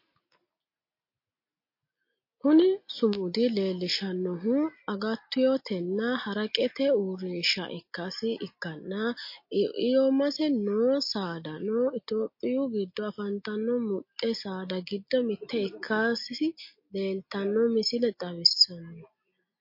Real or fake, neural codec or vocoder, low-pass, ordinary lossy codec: real; none; 5.4 kHz; MP3, 32 kbps